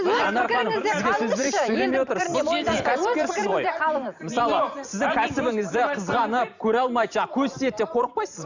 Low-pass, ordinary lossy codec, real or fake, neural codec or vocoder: 7.2 kHz; none; fake; vocoder, 44.1 kHz, 128 mel bands every 512 samples, BigVGAN v2